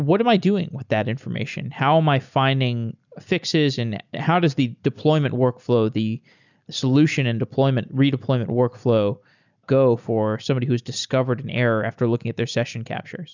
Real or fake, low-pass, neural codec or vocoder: real; 7.2 kHz; none